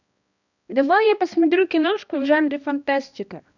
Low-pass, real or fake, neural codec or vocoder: 7.2 kHz; fake; codec, 16 kHz, 1 kbps, X-Codec, HuBERT features, trained on balanced general audio